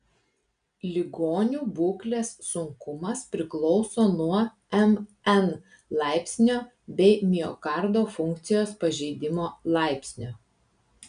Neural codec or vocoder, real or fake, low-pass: none; real; 9.9 kHz